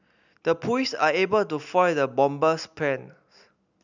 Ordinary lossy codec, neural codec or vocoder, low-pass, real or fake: none; none; 7.2 kHz; real